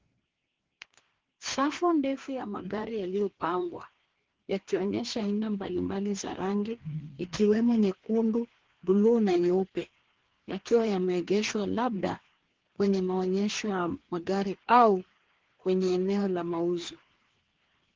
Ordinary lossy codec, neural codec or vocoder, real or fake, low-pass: Opus, 16 kbps; codec, 16 kHz, 2 kbps, FreqCodec, larger model; fake; 7.2 kHz